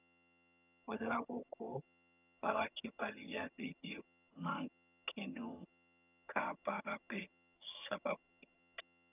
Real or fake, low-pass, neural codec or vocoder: fake; 3.6 kHz; vocoder, 22.05 kHz, 80 mel bands, HiFi-GAN